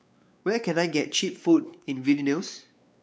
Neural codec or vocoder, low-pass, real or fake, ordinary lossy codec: codec, 16 kHz, 4 kbps, X-Codec, WavLM features, trained on Multilingual LibriSpeech; none; fake; none